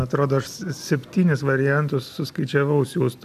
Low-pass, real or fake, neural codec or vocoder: 14.4 kHz; real; none